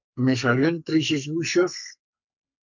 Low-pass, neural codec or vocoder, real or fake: 7.2 kHz; codec, 44.1 kHz, 2.6 kbps, SNAC; fake